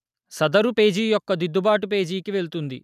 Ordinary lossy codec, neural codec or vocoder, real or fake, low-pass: none; none; real; 14.4 kHz